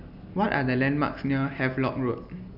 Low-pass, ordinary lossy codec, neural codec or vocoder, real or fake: 5.4 kHz; none; none; real